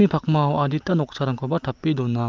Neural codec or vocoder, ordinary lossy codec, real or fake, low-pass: none; Opus, 32 kbps; real; 7.2 kHz